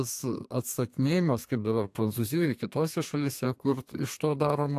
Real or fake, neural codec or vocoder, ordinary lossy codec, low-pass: fake; codec, 32 kHz, 1.9 kbps, SNAC; AAC, 64 kbps; 14.4 kHz